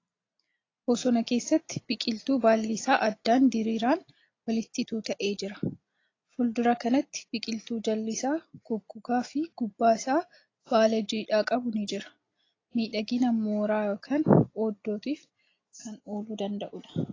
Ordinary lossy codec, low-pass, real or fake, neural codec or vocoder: AAC, 32 kbps; 7.2 kHz; real; none